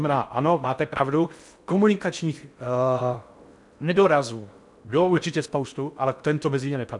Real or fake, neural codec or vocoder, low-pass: fake; codec, 16 kHz in and 24 kHz out, 0.6 kbps, FocalCodec, streaming, 4096 codes; 10.8 kHz